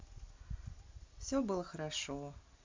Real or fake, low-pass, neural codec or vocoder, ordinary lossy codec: real; 7.2 kHz; none; MP3, 48 kbps